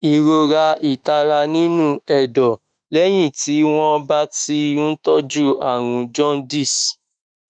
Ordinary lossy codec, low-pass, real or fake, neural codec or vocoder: none; 9.9 kHz; fake; autoencoder, 48 kHz, 32 numbers a frame, DAC-VAE, trained on Japanese speech